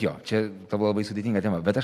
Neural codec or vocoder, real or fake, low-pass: none; real; 14.4 kHz